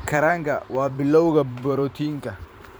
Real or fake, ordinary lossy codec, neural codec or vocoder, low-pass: fake; none; vocoder, 44.1 kHz, 128 mel bands every 512 samples, BigVGAN v2; none